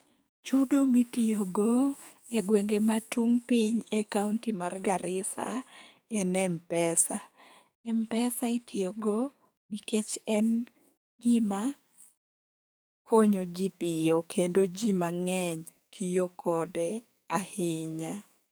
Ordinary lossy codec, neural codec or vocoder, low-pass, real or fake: none; codec, 44.1 kHz, 2.6 kbps, SNAC; none; fake